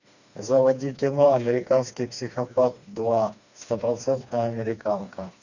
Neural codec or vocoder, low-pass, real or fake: codec, 16 kHz, 2 kbps, FreqCodec, smaller model; 7.2 kHz; fake